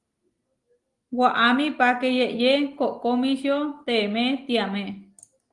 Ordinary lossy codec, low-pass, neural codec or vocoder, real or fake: Opus, 24 kbps; 10.8 kHz; none; real